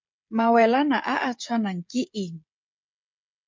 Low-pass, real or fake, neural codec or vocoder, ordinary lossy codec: 7.2 kHz; fake; codec, 16 kHz, 16 kbps, FreqCodec, smaller model; MP3, 48 kbps